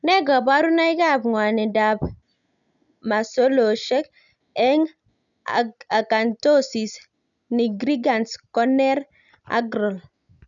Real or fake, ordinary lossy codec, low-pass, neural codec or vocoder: real; none; 7.2 kHz; none